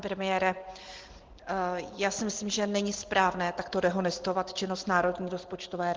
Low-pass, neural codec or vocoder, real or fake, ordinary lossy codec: 7.2 kHz; none; real; Opus, 16 kbps